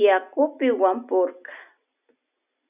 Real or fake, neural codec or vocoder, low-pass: real; none; 3.6 kHz